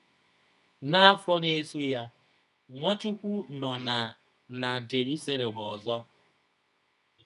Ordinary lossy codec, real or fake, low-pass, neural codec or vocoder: none; fake; 10.8 kHz; codec, 24 kHz, 0.9 kbps, WavTokenizer, medium music audio release